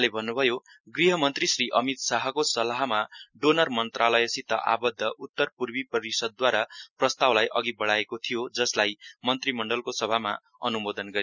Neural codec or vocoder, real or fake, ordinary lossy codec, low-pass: none; real; none; 7.2 kHz